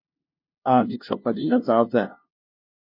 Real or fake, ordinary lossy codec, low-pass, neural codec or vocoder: fake; MP3, 32 kbps; 5.4 kHz; codec, 16 kHz, 0.5 kbps, FunCodec, trained on LibriTTS, 25 frames a second